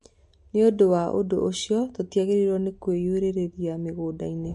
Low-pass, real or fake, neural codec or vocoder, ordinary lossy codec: 14.4 kHz; real; none; MP3, 48 kbps